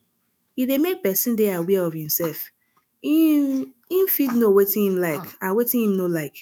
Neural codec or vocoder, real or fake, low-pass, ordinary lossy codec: autoencoder, 48 kHz, 128 numbers a frame, DAC-VAE, trained on Japanese speech; fake; none; none